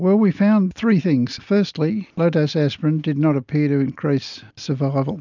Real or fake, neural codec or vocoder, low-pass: real; none; 7.2 kHz